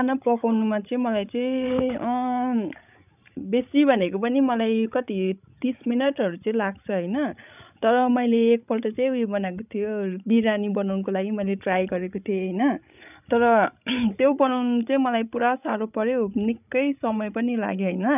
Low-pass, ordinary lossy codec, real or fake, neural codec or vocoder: 3.6 kHz; none; fake; codec, 16 kHz, 16 kbps, FreqCodec, larger model